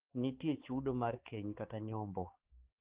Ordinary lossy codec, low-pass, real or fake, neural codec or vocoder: Opus, 16 kbps; 3.6 kHz; fake; codec, 16 kHz, 4 kbps, X-Codec, HuBERT features, trained on balanced general audio